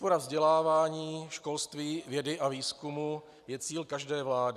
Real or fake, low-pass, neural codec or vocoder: real; 14.4 kHz; none